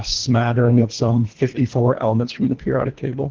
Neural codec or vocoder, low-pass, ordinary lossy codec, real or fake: codec, 24 kHz, 1.5 kbps, HILCodec; 7.2 kHz; Opus, 16 kbps; fake